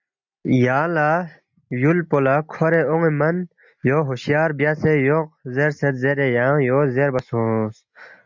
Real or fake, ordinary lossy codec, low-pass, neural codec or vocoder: real; MP3, 64 kbps; 7.2 kHz; none